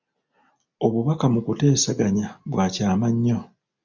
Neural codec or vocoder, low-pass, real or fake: none; 7.2 kHz; real